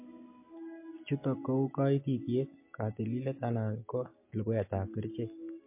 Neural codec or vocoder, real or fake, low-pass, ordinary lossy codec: none; real; 3.6 kHz; MP3, 24 kbps